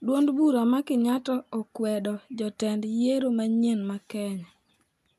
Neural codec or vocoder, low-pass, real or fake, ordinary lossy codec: none; 14.4 kHz; real; none